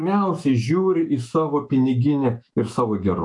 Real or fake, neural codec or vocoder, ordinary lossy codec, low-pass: fake; autoencoder, 48 kHz, 128 numbers a frame, DAC-VAE, trained on Japanese speech; AAC, 64 kbps; 10.8 kHz